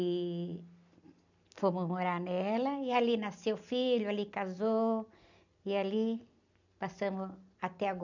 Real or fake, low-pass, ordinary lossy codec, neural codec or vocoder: fake; 7.2 kHz; none; vocoder, 44.1 kHz, 128 mel bands every 256 samples, BigVGAN v2